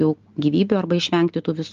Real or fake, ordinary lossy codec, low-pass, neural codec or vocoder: real; Opus, 32 kbps; 7.2 kHz; none